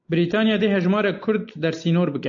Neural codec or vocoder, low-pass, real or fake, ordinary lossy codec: none; 7.2 kHz; real; AAC, 64 kbps